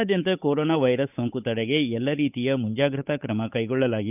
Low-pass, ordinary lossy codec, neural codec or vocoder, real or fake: 3.6 kHz; none; codec, 16 kHz, 8 kbps, FunCodec, trained on Chinese and English, 25 frames a second; fake